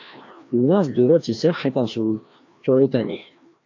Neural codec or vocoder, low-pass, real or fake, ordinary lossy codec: codec, 16 kHz, 1 kbps, FreqCodec, larger model; 7.2 kHz; fake; AAC, 48 kbps